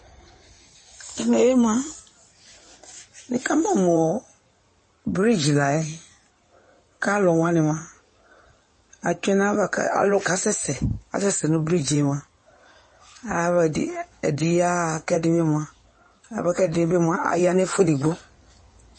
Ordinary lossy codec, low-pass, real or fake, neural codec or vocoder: MP3, 32 kbps; 9.9 kHz; fake; codec, 16 kHz in and 24 kHz out, 2.2 kbps, FireRedTTS-2 codec